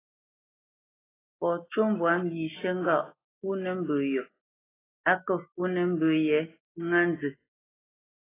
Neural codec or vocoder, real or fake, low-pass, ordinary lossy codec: none; real; 3.6 kHz; AAC, 16 kbps